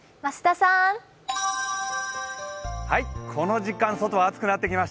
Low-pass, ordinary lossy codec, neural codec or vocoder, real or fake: none; none; none; real